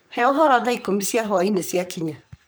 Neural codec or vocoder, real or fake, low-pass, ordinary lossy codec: codec, 44.1 kHz, 3.4 kbps, Pupu-Codec; fake; none; none